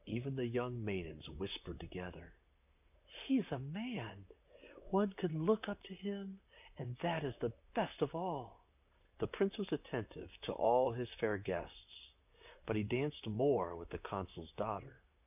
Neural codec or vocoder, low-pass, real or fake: vocoder, 44.1 kHz, 128 mel bands, Pupu-Vocoder; 3.6 kHz; fake